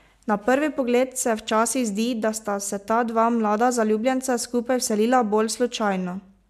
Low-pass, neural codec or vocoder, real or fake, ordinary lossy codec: 14.4 kHz; none; real; MP3, 96 kbps